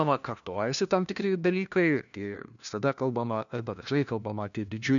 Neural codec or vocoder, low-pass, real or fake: codec, 16 kHz, 1 kbps, FunCodec, trained on LibriTTS, 50 frames a second; 7.2 kHz; fake